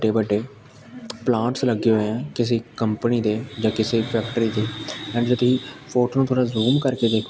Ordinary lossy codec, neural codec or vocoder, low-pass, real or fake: none; none; none; real